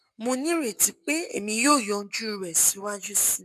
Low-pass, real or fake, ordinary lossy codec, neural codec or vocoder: 14.4 kHz; fake; none; codec, 44.1 kHz, 7.8 kbps, Pupu-Codec